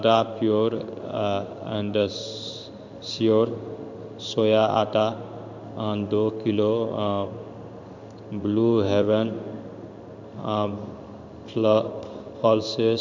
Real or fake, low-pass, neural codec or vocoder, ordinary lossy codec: fake; 7.2 kHz; codec, 16 kHz in and 24 kHz out, 1 kbps, XY-Tokenizer; none